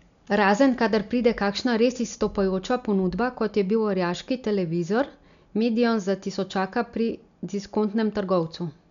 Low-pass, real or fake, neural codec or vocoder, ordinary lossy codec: 7.2 kHz; real; none; none